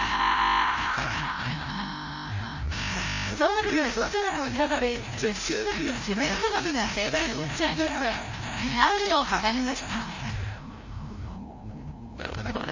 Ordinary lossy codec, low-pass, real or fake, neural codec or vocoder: MP3, 32 kbps; 7.2 kHz; fake; codec, 16 kHz, 0.5 kbps, FreqCodec, larger model